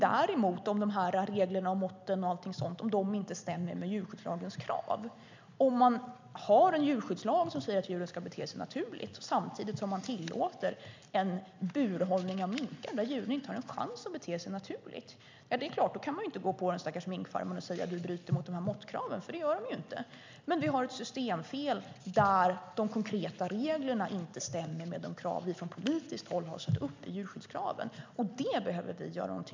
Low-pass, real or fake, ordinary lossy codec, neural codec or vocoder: 7.2 kHz; real; MP3, 64 kbps; none